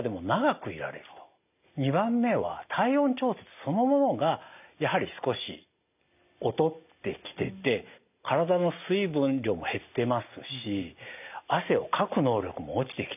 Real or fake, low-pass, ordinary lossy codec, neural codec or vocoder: real; 3.6 kHz; none; none